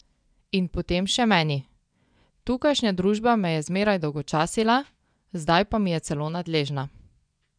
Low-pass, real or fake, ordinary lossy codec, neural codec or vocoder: 9.9 kHz; fake; none; vocoder, 24 kHz, 100 mel bands, Vocos